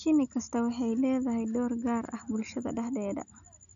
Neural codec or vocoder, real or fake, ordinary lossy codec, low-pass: none; real; none; 7.2 kHz